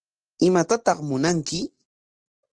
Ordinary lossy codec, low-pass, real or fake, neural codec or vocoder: Opus, 16 kbps; 9.9 kHz; real; none